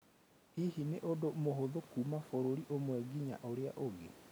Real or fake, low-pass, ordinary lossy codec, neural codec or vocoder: real; none; none; none